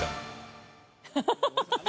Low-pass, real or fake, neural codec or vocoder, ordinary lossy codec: none; real; none; none